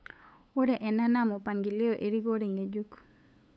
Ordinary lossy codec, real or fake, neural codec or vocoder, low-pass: none; fake; codec, 16 kHz, 8 kbps, FunCodec, trained on LibriTTS, 25 frames a second; none